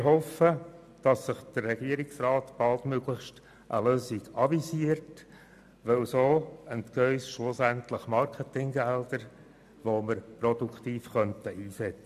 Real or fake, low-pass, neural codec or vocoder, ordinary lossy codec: real; 14.4 kHz; none; MP3, 96 kbps